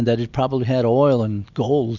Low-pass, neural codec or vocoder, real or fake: 7.2 kHz; none; real